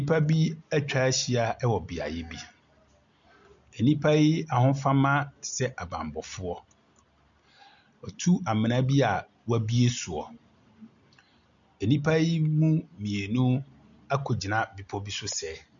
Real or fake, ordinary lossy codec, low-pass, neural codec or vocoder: real; MP3, 96 kbps; 7.2 kHz; none